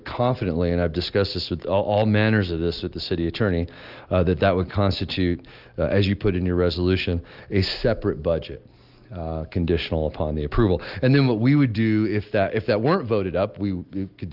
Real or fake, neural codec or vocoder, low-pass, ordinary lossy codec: real; none; 5.4 kHz; Opus, 32 kbps